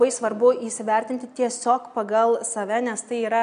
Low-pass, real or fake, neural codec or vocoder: 9.9 kHz; real; none